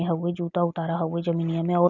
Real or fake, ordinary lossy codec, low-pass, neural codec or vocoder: real; none; 7.2 kHz; none